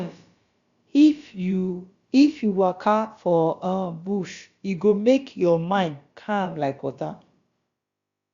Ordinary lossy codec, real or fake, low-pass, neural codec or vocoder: Opus, 64 kbps; fake; 7.2 kHz; codec, 16 kHz, about 1 kbps, DyCAST, with the encoder's durations